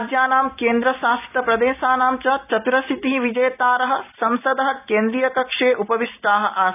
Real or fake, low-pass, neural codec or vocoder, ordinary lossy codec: real; 3.6 kHz; none; none